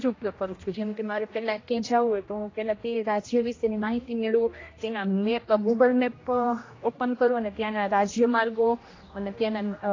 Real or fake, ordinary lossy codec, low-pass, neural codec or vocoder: fake; AAC, 32 kbps; 7.2 kHz; codec, 16 kHz, 1 kbps, X-Codec, HuBERT features, trained on general audio